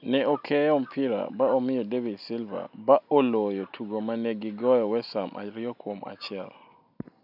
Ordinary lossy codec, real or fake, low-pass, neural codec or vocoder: none; real; 5.4 kHz; none